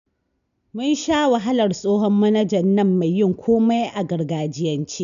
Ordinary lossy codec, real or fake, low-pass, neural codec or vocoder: none; real; 7.2 kHz; none